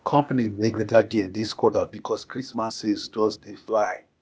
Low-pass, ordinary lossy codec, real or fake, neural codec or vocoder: none; none; fake; codec, 16 kHz, 0.8 kbps, ZipCodec